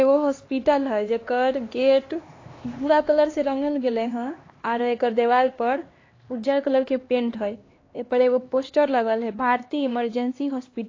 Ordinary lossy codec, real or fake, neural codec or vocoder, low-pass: AAC, 32 kbps; fake; codec, 16 kHz, 2 kbps, X-Codec, HuBERT features, trained on LibriSpeech; 7.2 kHz